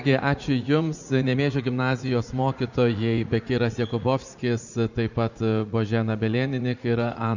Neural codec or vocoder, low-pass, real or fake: vocoder, 44.1 kHz, 80 mel bands, Vocos; 7.2 kHz; fake